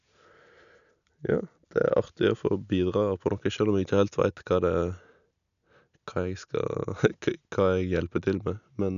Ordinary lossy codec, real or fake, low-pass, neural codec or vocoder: none; real; 7.2 kHz; none